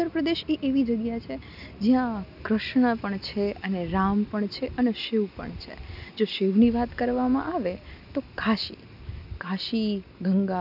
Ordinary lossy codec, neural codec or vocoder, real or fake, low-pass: none; none; real; 5.4 kHz